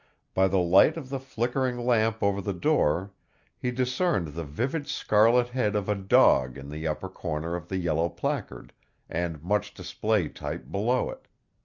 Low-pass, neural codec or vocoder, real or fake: 7.2 kHz; none; real